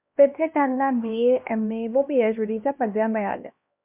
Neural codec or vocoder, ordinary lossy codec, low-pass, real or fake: codec, 16 kHz, 1 kbps, X-Codec, HuBERT features, trained on LibriSpeech; MP3, 32 kbps; 3.6 kHz; fake